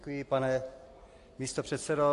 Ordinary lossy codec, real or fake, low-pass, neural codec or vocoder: AAC, 48 kbps; real; 10.8 kHz; none